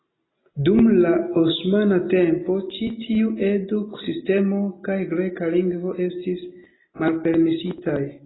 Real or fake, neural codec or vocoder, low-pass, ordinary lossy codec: real; none; 7.2 kHz; AAC, 16 kbps